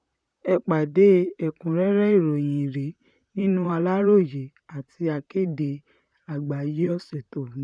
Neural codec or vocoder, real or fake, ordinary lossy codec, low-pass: vocoder, 44.1 kHz, 128 mel bands, Pupu-Vocoder; fake; none; 9.9 kHz